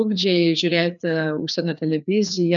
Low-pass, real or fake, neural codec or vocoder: 7.2 kHz; fake; codec, 16 kHz, 2 kbps, FreqCodec, larger model